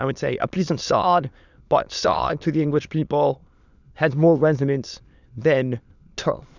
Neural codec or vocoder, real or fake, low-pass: autoencoder, 22.05 kHz, a latent of 192 numbers a frame, VITS, trained on many speakers; fake; 7.2 kHz